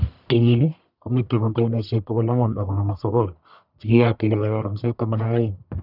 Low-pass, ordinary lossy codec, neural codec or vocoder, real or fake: 5.4 kHz; none; codec, 44.1 kHz, 1.7 kbps, Pupu-Codec; fake